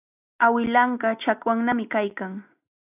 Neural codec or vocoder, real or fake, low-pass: none; real; 3.6 kHz